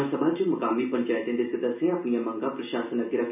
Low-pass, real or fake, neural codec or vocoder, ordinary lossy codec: 3.6 kHz; real; none; none